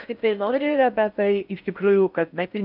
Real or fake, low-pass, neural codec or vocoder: fake; 5.4 kHz; codec, 16 kHz in and 24 kHz out, 0.6 kbps, FocalCodec, streaming, 4096 codes